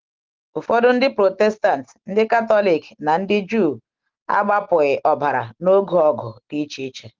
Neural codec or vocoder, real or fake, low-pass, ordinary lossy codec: none; real; 7.2 kHz; Opus, 16 kbps